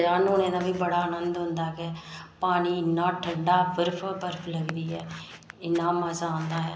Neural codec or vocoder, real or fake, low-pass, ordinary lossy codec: none; real; none; none